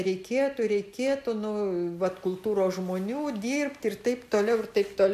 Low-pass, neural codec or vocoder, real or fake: 14.4 kHz; none; real